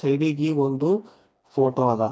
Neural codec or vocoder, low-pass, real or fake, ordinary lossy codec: codec, 16 kHz, 2 kbps, FreqCodec, smaller model; none; fake; none